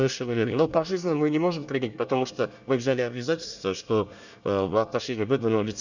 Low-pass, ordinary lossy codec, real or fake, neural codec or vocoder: 7.2 kHz; none; fake; codec, 24 kHz, 1 kbps, SNAC